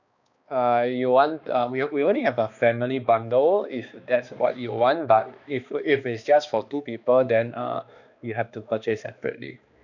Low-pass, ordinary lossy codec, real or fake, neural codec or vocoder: 7.2 kHz; none; fake; codec, 16 kHz, 2 kbps, X-Codec, HuBERT features, trained on balanced general audio